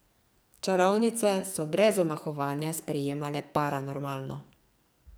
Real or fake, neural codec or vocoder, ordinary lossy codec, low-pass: fake; codec, 44.1 kHz, 2.6 kbps, SNAC; none; none